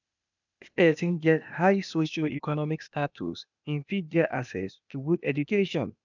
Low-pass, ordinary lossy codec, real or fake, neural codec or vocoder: 7.2 kHz; none; fake; codec, 16 kHz, 0.8 kbps, ZipCodec